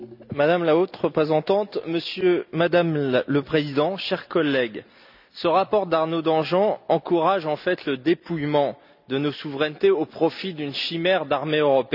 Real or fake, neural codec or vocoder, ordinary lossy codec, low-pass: real; none; none; 5.4 kHz